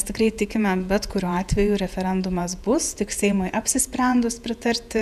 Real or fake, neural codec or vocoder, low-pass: fake; vocoder, 48 kHz, 128 mel bands, Vocos; 14.4 kHz